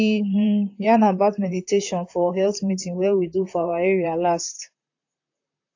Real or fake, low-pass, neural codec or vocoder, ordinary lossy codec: fake; 7.2 kHz; vocoder, 44.1 kHz, 128 mel bands, Pupu-Vocoder; AAC, 48 kbps